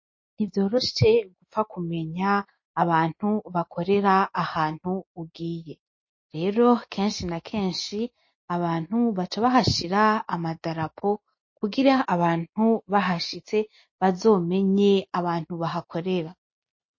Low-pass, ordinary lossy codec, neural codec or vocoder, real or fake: 7.2 kHz; MP3, 32 kbps; none; real